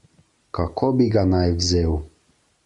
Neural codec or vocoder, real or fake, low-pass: none; real; 10.8 kHz